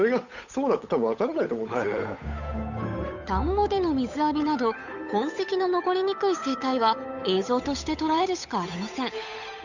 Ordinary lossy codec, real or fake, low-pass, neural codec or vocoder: none; fake; 7.2 kHz; codec, 16 kHz, 8 kbps, FunCodec, trained on Chinese and English, 25 frames a second